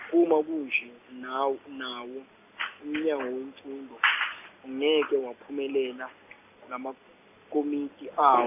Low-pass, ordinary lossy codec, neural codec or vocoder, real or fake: 3.6 kHz; none; none; real